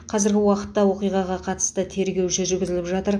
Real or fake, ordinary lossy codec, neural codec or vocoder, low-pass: real; MP3, 64 kbps; none; 9.9 kHz